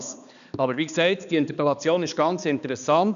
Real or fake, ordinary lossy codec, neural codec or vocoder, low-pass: fake; none; codec, 16 kHz, 2 kbps, X-Codec, HuBERT features, trained on balanced general audio; 7.2 kHz